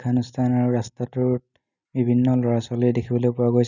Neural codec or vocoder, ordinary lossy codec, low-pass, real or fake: none; none; 7.2 kHz; real